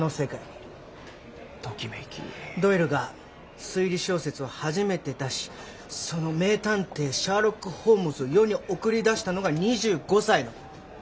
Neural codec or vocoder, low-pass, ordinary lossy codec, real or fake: none; none; none; real